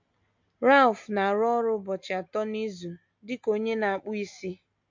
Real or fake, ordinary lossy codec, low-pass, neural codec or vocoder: real; MP3, 48 kbps; 7.2 kHz; none